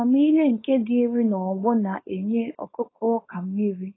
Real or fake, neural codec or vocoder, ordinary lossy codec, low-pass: fake; codec, 16 kHz, 4.8 kbps, FACodec; AAC, 16 kbps; 7.2 kHz